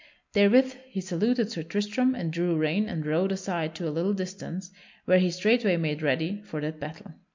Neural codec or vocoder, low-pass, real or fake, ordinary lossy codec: none; 7.2 kHz; real; MP3, 64 kbps